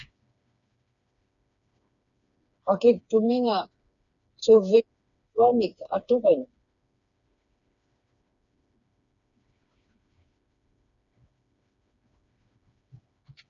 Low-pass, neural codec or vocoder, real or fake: 7.2 kHz; codec, 16 kHz, 4 kbps, FreqCodec, smaller model; fake